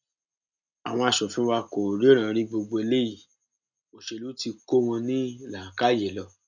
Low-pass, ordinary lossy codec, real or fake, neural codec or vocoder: 7.2 kHz; none; real; none